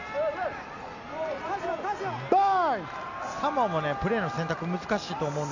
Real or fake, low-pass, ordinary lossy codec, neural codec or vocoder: real; 7.2 kHz; none; none